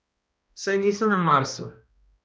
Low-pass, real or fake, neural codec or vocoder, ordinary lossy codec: none; fake; codec, 16 kHz, 1 kbps, X-Codec, HuBERT features, trained on balanced general audio; none